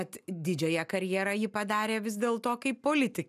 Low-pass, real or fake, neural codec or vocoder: 14.4 kHz; real; none